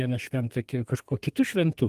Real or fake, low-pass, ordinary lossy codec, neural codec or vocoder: fake; 14.4 kHz; Opus, 24 kbps; codec, 32 kHz, 1.9 kbps, SNAC